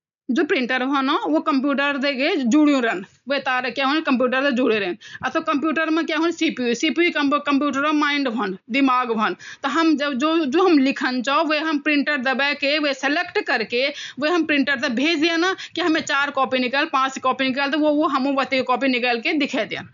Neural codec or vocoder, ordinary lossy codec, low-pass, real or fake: none; none; 7.2 kHz; real